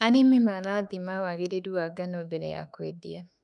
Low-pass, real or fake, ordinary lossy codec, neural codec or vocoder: 10.8 kHz; fake; none; autoencoder, 48 kHz, 32 numbers a frame, DAC-VAE, trained on Japanese speech